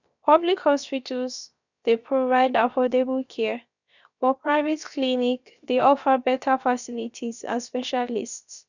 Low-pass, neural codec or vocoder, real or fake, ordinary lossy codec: 7.2 kHz; codec, 16 kHz, about 1 kbps, DyCAST, with the encoder's durations; fake; none